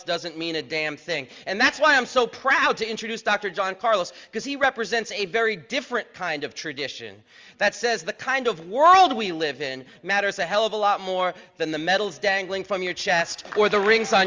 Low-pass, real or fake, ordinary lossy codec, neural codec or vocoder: 7.2 kHz; real; Opus, 32 kbps; none